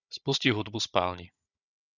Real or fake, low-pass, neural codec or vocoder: fake; 7.2 kHz; codec, 16 kHz, 16 kbps, FunCodec, trained on Chinese and English, 50 frames a second